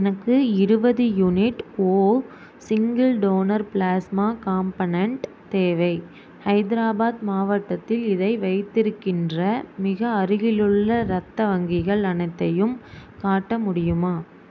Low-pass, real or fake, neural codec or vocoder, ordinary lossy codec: none; real; none; none